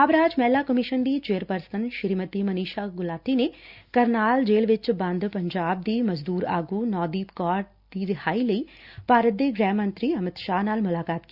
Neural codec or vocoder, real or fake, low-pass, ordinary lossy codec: none; real; 5.4 kHz; Opus, 64 kbps